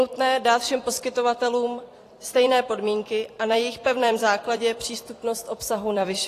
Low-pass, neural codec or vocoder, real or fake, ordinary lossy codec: 14.4 kHz; vocoder, 44.1 kHz, 128 mel bands every 256 samples, BigVGAN v2; fake; AAC, 48 kbps